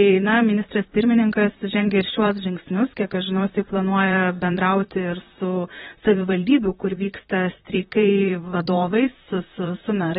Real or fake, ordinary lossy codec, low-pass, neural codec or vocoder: fake; AAC, 16 kbps; 19.8 kHz; vocoder, 44.1 kHz, 128 mel bands, Pupu-Vocoder